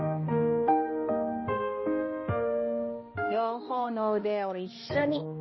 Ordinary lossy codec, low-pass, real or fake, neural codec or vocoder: MP3, 24 kbps; 7.2 kHz; fake; codec, 16 kHz, 1 kbps, X-Codec, HuBERT features, trained on balanced general audio